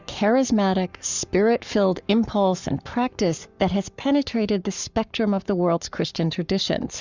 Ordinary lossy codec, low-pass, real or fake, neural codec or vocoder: Opus, 64 kbps; 7.2 kHz; fake; codec, 44.1 kHz, 7.8 kbps, Pupu-Codec